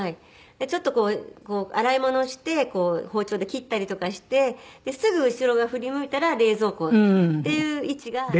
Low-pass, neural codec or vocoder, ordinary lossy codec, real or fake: none; none; none; real